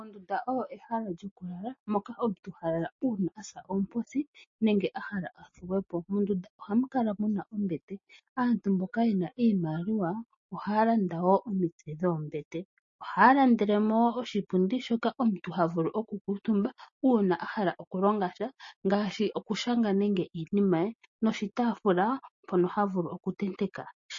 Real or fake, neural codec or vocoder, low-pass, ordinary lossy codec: real; none; 7.2 kHz; MP3, 32 kbps